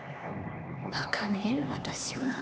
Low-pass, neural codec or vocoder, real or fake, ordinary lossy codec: none; codec, 16 kHz, 2 kbps, X-Codec, HuBERT features, trained on LibriSpeech; fake; none